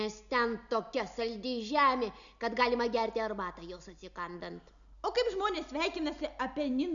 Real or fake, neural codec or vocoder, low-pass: real; none; 7.2 kHz